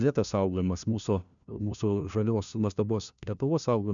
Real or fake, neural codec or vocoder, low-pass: fake; codec, 16 kHz, 1 kbps, FunCodec, trained on LibriTTS, 50 frames a second; 7.2 kHz